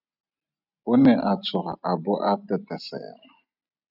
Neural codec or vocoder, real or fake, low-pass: none; real; 5.4 kHz